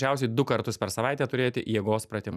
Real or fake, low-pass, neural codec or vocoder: real; 14.4 kHz; none